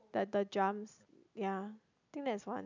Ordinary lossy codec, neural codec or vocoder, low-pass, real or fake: none; none; 7.2 kHz; real